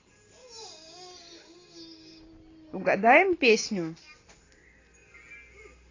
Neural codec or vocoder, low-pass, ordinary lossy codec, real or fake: none; 7.2 kHz; AAC, 32 kbps; real